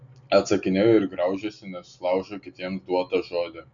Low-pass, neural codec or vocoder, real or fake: 7.2 kHz; none; real